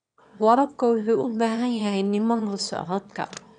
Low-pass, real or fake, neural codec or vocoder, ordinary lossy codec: 9.9 kHz; fake; autoencoder, 22.05 kHz, a latent of 192 numbers a frame, VITS, trained on one speaker; none